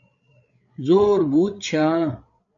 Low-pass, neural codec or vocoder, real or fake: 7.2 kHz; codec, 16 kHz, 8 kbps, FreqCodec, larger model; fake